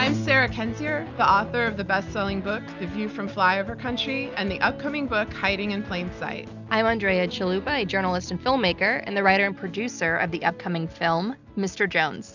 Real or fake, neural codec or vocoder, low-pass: real; none; 7.2 kHz